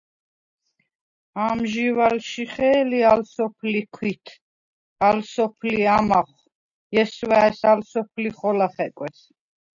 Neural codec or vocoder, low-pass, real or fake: none; 7.2 kHz; real